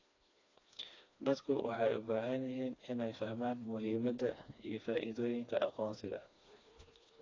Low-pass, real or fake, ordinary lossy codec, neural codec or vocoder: 7.2 kHz; fake; AAC, 32 kbps; codec, 16 kHz, 2 kbps, FreqCodec, smaller model